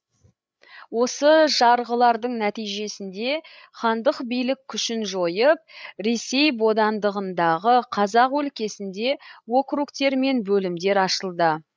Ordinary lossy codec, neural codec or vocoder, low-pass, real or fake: none; codec, 16 kHz, 16 kbps, FreqCodec, larger model; none; fake